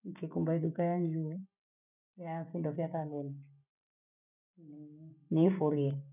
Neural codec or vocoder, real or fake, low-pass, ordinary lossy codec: none; real; 3.6 kHz; none